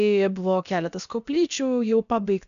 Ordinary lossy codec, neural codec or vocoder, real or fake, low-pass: AAC, 96 kbps; codec, 16 kHz, about 1 kbps, DyCAST, with the encoder's durations; fake; 7.2 kHz